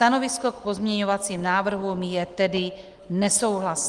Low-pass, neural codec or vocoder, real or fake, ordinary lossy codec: 10.8 kHz; none; real; Opus, 24 kbps